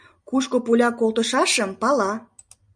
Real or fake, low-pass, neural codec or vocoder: real; 9.9 kHz; none